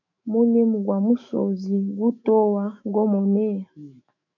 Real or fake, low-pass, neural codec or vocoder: fake; 7.2 kHz; autoencoder, 48 kHz, 128 numbers a frame, DAC-VAE, trained on Japanese speech